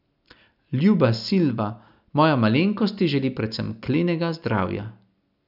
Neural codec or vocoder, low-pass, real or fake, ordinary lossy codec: none; 5.4 kHz; real; none